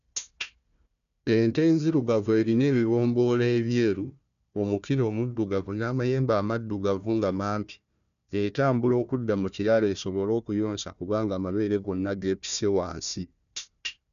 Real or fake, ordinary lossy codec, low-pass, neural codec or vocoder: fake; none; 7.2 kHz; codec, 16 kHz, 1 kbps, FunCodec, trained on Chinese and English, 50 frames a second